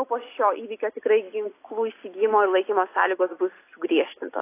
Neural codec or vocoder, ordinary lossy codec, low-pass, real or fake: none; AAC, 24 kbps; 3.6 kHz; real